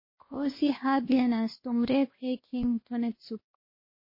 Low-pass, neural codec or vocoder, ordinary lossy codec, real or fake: 5.4 kHz; codec, 16 kHz, 1 kbps, X-Codec, WavLM features, trained on Multilingual LibriSpeech; MP3, 24 kbps; fake